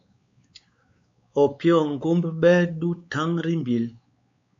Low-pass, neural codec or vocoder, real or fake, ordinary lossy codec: 7.2 kHz; codec, 16 kHz, 4 kbps, X-Codec, WavLM features, trained on Multilingual LibriSpeech; fake; MP3, 48 kbps